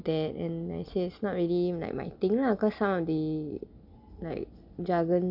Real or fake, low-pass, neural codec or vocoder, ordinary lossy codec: real; 5.4 kHz; none; none